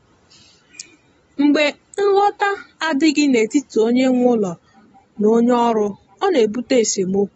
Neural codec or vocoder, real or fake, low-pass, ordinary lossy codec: none; real; 19.8 kHz; AAC, 24 kbps